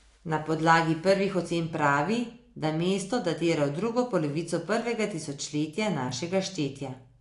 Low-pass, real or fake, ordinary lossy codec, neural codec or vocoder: 10.8 kHz; real; AAC, 48 kbps; none